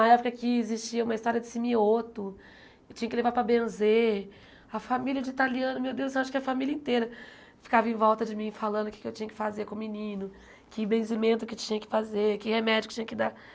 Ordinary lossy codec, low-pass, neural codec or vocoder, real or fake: none; none; none; real